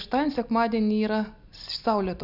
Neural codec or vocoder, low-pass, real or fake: none; 5.4 kHz; real